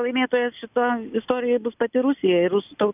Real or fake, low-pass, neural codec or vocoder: real; 3.6 kHz; none